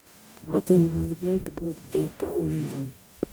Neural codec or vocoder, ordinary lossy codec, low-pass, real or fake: codec, 44.1 kHz, 0.9 kbps, DAC; none; none; fake